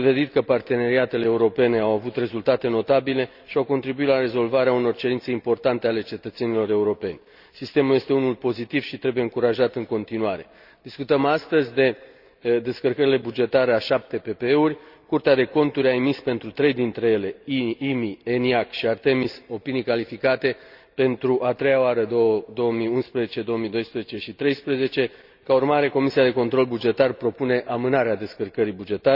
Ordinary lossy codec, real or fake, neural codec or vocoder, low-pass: none; real; none; 5.4 kHz